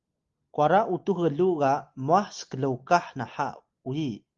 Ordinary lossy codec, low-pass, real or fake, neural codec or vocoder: Opus, 32 kbps; 7.2 kHz; real; none